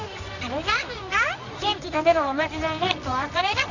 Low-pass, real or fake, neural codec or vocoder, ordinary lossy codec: 7.2 kHz; fake; codec, 24 kHz, 0.9 kbps, WavTokenizer, medium music audio release; none